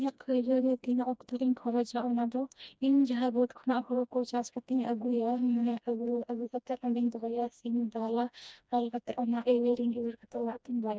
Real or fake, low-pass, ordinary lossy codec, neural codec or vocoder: fake; none; none; codec, 16 kHz, 1 kbps, FreqCodec, smaller model